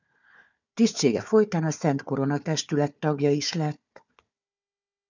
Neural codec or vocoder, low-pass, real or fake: codec, 16 kHz, 4 kbps, FunCodec, trained on Chinese and English, 50 frames a second; 7.2 kHz; fake